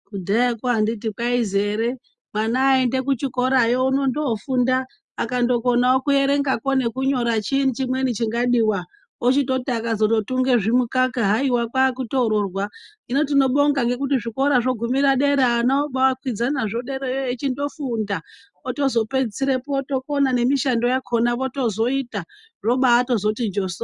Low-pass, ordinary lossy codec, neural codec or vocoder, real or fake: 10.8 kHz; Opus, 64 kbps; none; real